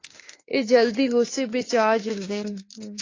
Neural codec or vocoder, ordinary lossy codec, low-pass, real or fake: autoencoder, 48 kHz, 32 numbers a frame, DAC-VAE, trained on Japanese speech; AAC, 32 kbps; 7.2 kHz; fake